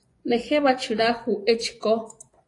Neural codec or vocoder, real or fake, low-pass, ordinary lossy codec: vocoder, 24 kHz, 100 mel bands, Vocos; fake; 10.8 kHz; AAC, 48 kbps